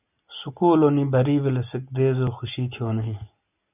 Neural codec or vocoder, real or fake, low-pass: none; real; 3.6 kHz